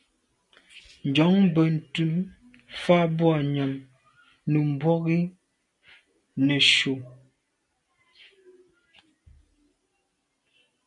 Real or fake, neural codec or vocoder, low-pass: real; none; 10.8 kHz